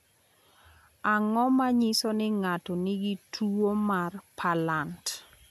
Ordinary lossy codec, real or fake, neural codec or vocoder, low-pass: none; real; none; 14.4 kHz